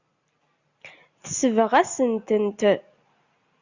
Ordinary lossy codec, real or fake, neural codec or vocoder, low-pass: Opus, 64 kbps; real; none; 7.2 kHz